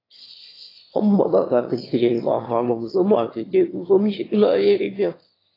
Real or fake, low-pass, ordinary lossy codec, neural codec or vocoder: fake; 5.4 kHz; AAC, 24 kbps; autoencoder, 22.05 kHz, a latent of 192 numbers a frame, VITS, trained on one speaker